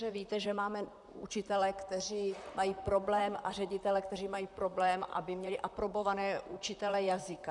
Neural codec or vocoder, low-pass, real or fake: vocoder, 44.1 kHz, 128 mel bands, Pupu-Vocoder; 10.8 kHz; fake